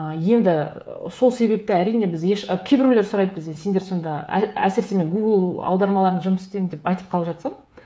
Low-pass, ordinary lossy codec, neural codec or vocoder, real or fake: none; none; codec, 16 kHz, 8 kbps, FreqCodec, smaller model; fake